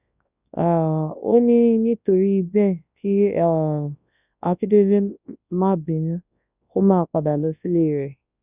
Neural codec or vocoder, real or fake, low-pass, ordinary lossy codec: codec, 24 kHz, 0.9 kbps, WavTokenizer, large speech release; fake; 3.6 kHz; none